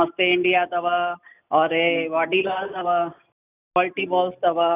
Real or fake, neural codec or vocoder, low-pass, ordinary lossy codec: real; none; 3.6 kHz; none